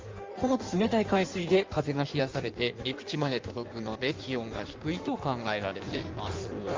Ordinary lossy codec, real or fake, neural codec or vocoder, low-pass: Opus, 32 kbps; fake; codec, 16 kHz in and 24 kHz out, 1.1 kbps, FireRedTTS-2 codec; 7.2 kHz